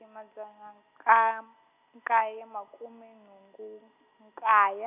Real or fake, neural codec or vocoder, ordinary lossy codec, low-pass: real; none; none; 3.6 kHz